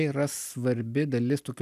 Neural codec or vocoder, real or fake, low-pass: none; real; 14.4 kHz